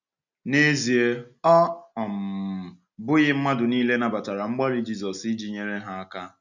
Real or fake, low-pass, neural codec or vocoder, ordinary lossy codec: real; 7.2 kHz; none; none